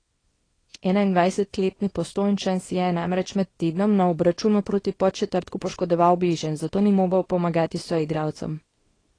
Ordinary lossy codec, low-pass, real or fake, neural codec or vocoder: AAC, 32 kbps; 9.9 kHz; fake; codec, 24 kHz, 0.9 kbps, WavTokenizer, small release